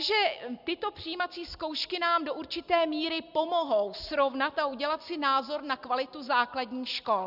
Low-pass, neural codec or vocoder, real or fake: 5.4 kHz; none; real